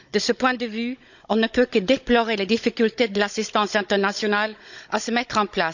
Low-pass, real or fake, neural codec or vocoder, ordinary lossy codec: 7.2 kHz; fake; codec, 16 kHz, 16 kbps, FunCodec, trained on Chinese and English, 50 frames a second; none